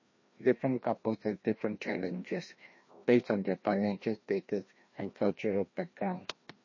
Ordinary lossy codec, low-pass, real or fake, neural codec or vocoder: MP3, 32 kbps; 7.2 kHz; fake; codec, 16 kHz, 1 kbps, FreqCodec, larger model